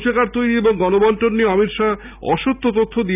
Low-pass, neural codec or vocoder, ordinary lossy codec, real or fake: 3.6 kHz; none; none; real